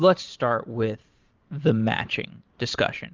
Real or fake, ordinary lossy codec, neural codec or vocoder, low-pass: fake; Opus, 32 kbps; vocoder, 44.1 kHz, 128 mel bands every 512 samples, BigVGAN v2; 7.2 kHz